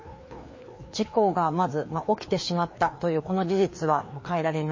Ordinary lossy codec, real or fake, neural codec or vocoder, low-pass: MP3, 32 kbps; fake; codec, 16 kHz, 2 kbps, FreqCodec, larger model; 7.2 kHz